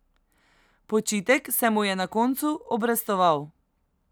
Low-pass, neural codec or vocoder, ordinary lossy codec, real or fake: none; none; none; real